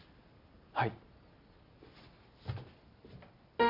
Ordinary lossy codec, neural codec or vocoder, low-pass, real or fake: none; none; 5.4 kHz; real